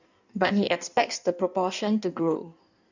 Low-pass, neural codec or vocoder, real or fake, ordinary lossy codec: 7.2 kHz; codec, 16 kHz in and 24 kHz out, 1.1 kbps, FireRedTTS-2 codec; fake; none